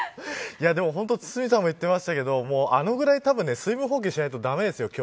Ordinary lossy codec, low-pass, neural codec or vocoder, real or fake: none; none; none; real